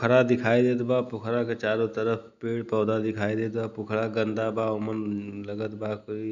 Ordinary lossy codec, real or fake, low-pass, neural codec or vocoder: none; real; 7.2 kHz; none